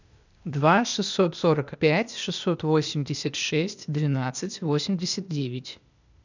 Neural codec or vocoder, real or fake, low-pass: codec, 16 kHz, 0.8 kbps, ZipCodec; fake; 7.2 kHz